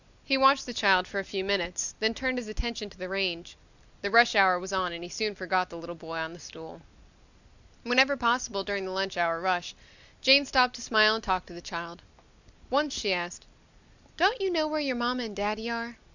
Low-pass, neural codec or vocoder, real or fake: 7.2 kHz; none; real